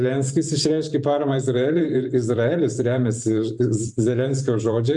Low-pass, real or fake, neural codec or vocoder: 10.8 kHz; fake; vocoder, 44.1 kHz, 128 mel bands every 512 samples, BigVGAN v2